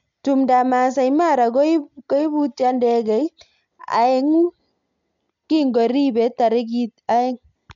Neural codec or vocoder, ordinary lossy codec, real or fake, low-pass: none; MP3, 64 kbps; real; 7.2 kHz